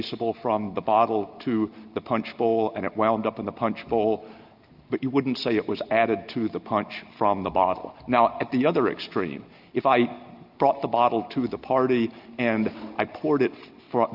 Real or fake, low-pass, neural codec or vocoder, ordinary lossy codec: real; 5.4 kHz; none; Opus, 32 kbps